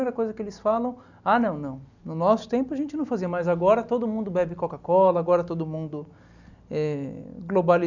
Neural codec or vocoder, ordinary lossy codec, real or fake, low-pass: none; none; real; 7.2 kHz